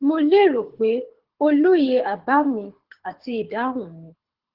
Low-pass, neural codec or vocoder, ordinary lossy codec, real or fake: 5.4 kHz; codec, 24 kHz, 6 kbps, HILCodec; Opus, 16 kbps; fake